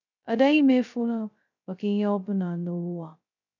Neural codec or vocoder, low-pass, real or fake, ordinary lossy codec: codec, 16 kHz, 0.2 kbps, FocalCodec; 7.2 kHz; fake; none